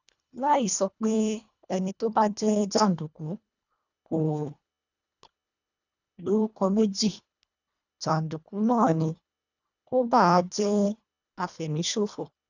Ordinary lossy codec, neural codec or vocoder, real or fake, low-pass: none; codec, 24 kHz, 1.5 kbps, HILCodec; fake; 7.2 kHz